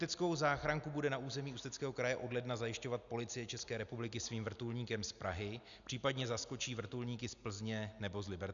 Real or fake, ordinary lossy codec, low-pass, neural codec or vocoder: real; MP3, 96 kbps; 7.2 kHz; none